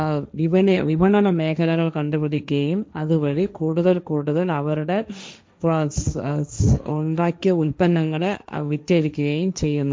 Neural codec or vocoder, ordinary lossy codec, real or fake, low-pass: codec, 16 kHz, 1.1 kbps, Voila-Tokenizer; none; fake; none